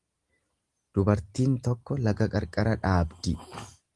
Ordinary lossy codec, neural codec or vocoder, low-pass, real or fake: Opus, 24 kbps; none; 10.8 kHz; real